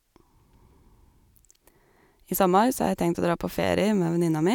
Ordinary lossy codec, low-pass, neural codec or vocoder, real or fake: none; 19.8 kHz; none; real